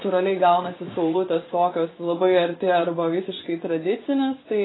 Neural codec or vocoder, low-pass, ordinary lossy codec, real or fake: vocoder, 24 kHz, 100 mel bands, Vocos; 7.2 kHz; AAC, 16 kbps; fake